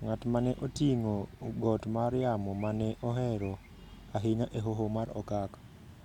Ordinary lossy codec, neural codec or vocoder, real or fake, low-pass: none; none; real; 19.8 kHz